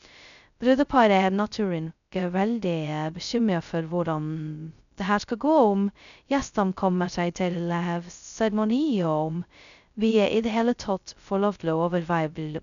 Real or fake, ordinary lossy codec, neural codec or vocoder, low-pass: fake; none; codec, 16 kHz, 0.2 kbps, FocalCodec; 7.2 kHz